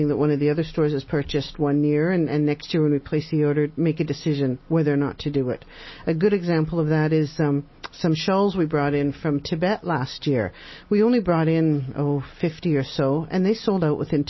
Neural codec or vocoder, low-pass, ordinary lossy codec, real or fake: none; 7.2 kHz; MP3, 24 kbps; real